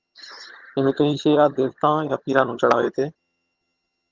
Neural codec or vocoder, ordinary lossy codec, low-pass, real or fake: vocoder, 22.05 kHz, 80 mel bands, HiFi-GAN; Opus, 32 kbps; 7.2 kHz; fake